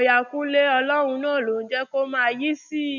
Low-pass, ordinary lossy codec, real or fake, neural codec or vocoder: 7.2 kHz; none; real; none